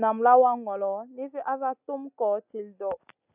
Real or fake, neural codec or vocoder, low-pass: real; none; 3.6 kHz